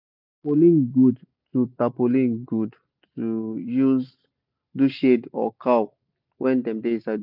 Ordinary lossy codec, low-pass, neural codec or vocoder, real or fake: none; 5.4 kHz; none; real